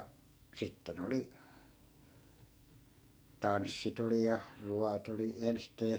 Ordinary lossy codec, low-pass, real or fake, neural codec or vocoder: none; none; fake; codec, 44.1 kHz, 2.6 kbps, SNAC